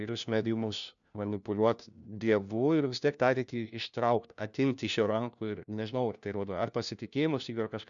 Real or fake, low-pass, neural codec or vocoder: fake; 7.2 kHz; codec, 16 kHz, 1 kbps, FunCodec, trained on LibriTTS, 50 frames a second